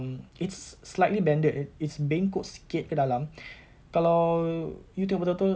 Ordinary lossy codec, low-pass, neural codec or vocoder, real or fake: none; none; none; real